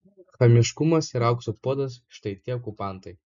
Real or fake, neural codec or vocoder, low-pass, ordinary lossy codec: real; none; 7.2 kHz; MP3, 64 kbps